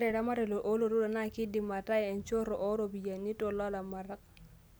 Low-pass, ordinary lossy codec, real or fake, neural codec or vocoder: none; none; real; none